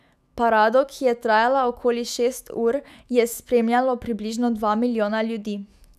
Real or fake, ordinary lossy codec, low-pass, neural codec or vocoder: fake; none; 14.4 kHz; autoencoder, 48 kHz, 128 numbers a frame, DAC-VAE, trained on Japanese speech